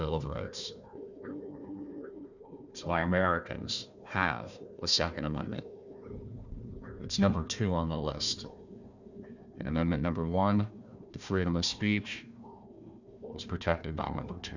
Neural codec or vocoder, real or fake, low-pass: codec, 16 kHz, 1 kbps, FunCodec, trained on Chinese and English, 50 frames a second; fake; 7.2 kHz